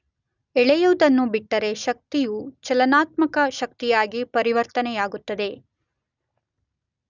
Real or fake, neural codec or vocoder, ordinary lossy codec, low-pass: real; none; none; 7.2 kHz